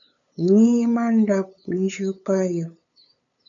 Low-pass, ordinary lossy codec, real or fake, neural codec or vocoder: 7.2 kHz; MP3, 96 kbps; fake; codec, 16 kHz, 8 kbps, FunCodec, trained on LibriTTS, 25 frames a second